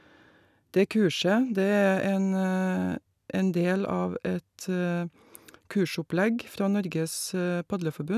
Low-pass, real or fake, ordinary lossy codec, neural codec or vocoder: 14.4 kHz; real; none; none